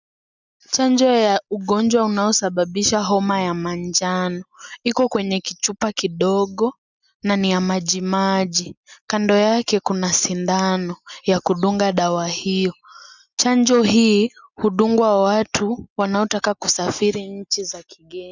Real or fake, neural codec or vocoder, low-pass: real; none; 7.2 kHz